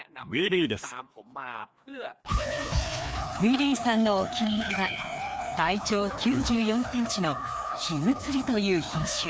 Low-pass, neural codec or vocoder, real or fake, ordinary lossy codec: none; codec, 16 kHz, 2 kbps, FreqCodec, larger model; fake; none